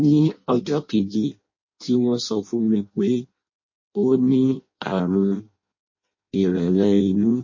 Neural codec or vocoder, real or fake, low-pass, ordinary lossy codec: codec, 16 kHz in and 24 kHz out, 0.6 kbps, FireRedTTS-2 codec; fake; 7.2 kHz; MP3, 32 kbps